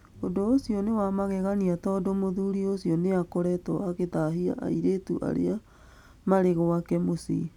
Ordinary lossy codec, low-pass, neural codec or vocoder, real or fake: none; 19.8 kHz; none; real